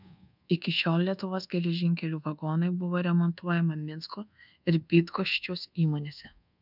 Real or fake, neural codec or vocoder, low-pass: fake; codec, 24 kHz, 1.2 kbps, DualCodec; 5.4 kHz